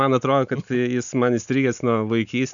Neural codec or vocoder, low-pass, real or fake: none; 7.2 kHz; real